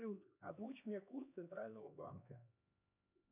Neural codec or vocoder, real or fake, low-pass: codec, 16 kHz, 2 kbps, X-Codec, HuBERT features, trained on LibriSpeech; fake; 3.6 kHz